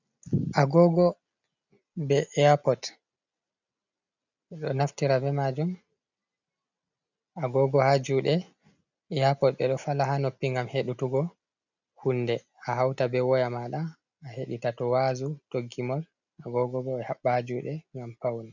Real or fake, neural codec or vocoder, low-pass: real; none; 7.2 kHz